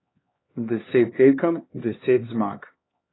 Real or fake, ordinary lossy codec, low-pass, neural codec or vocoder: fake; AAC, 16 kbps; 7.2 kHz; codec, 16 kHz, 2 kbps, X-Codec, HuBERT features, trained on LibriSpeech